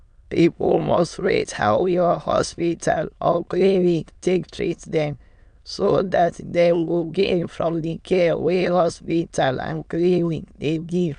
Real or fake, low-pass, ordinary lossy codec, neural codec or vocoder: fake; 9.9 kHz; MP3, 96 kbps; autoencoder, 22.05 kHz, a latent of 192 numbers a frame, VITS, trained on many speakers